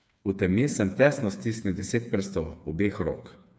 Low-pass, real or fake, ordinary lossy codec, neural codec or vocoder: none; fake; none; codec, 16 kHz, 4 kbps, FreqCodec, smaller model